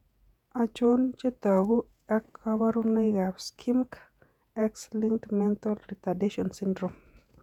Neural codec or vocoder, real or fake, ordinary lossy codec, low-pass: vocoder, 48 kHz, 128 mel bands, Vocos; fake; none; 19.8 kHz